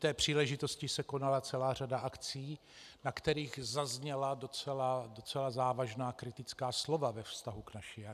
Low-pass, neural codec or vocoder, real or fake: 14.4 kHz; none; real